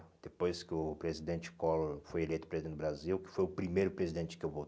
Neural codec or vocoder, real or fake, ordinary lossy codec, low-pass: none; real; none; none